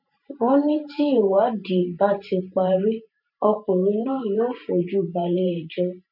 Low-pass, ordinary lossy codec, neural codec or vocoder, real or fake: 5.4 kHz; none; vocoder, 44.1 kHz, 128 mel bands every 512 samples, BigVGAN v2; fake